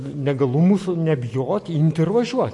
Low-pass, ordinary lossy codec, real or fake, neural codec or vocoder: 10.8 kHz; MP3, 48 kbps; real; none